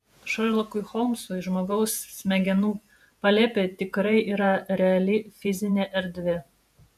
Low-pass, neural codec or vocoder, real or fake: 14.4 kHz; vocoder, 48 kHz, 128 mel bands, Vocos; fake